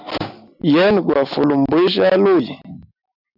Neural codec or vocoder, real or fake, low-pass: none; real; 5.4 kHz